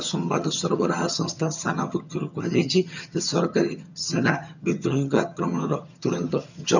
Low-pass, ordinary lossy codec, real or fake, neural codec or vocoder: 7.2 kHz; none; fake; vocoder, 22.05 kHz, 80 mel bands, HiFi-GAN